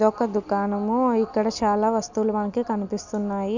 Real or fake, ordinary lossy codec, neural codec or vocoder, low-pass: real; none; none; 7.2 kHz